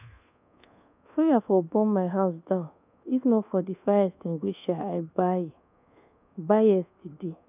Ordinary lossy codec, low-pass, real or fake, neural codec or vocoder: none; 3.6 kHz; fake; codec, 24 kHz, 1.2 kbps, DualCodec